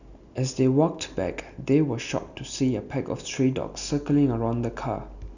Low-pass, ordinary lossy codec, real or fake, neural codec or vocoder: 7.2 kHz; none; real; none